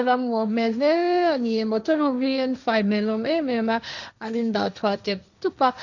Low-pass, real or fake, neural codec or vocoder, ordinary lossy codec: 7.2 kHz; fake; codec, 16 kHz, 1.1 kbps, Voila-Tokenizer; none